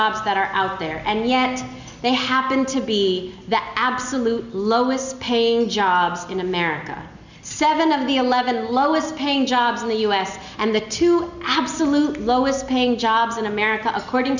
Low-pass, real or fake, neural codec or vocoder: 7.2 kHz; real; none